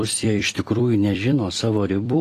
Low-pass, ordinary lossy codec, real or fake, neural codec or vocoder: 14.4 kHz; AAC, 48 kbps; fake; vocoder, 44.1 kHz, 128 mel bands every 256 samples, BigVGAN v2